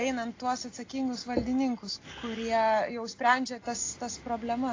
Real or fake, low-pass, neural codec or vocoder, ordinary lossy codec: real; 7.2 kHz; none; AAC, 32 kbps